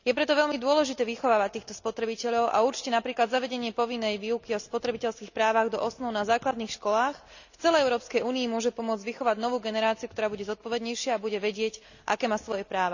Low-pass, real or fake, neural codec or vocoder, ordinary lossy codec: 7.2 kHz; real; none; none